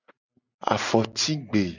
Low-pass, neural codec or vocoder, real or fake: 7.2 kHz; none; real